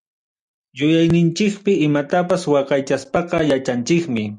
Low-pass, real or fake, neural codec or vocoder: 10.8 kHz; real; none